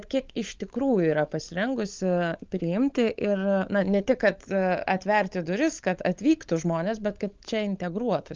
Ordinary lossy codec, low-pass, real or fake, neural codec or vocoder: Opus, 24 kbps; 7.2 kHz; fake; codec, 16 kHz, 16 kbps, FunCodec, trained on LibriTTS, 50 frames a second